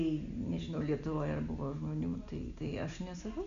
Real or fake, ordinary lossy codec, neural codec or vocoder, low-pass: real; AAC, 64 kbps; none; 7.2 kHz